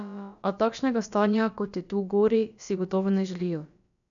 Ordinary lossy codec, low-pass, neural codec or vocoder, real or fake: none; 7.2 kHz; codec, 16 kHz, about 1 kbps, DyCAST, with the encoder's durations; fake